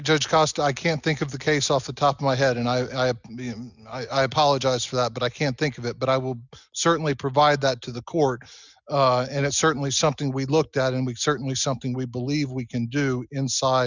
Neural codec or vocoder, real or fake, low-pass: none; real; 7.2 kHz